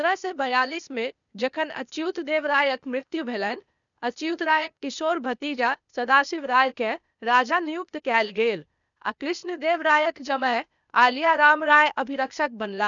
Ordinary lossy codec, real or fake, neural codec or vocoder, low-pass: none; fake; codec, 16 kHz, 0.8 kbps, ZipCodec; 7.2 kHz